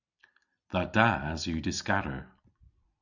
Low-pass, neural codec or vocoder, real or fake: 7.2 kHz; vocoder, 44.1 kHz, 128 mel bands every 256 samples, BigVGAN v2; fake